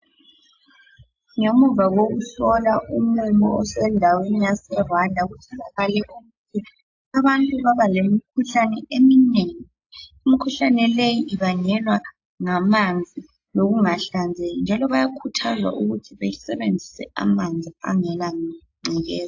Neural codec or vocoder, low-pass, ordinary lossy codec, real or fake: none; 7.2 kHz; AAC, 48 kbps; real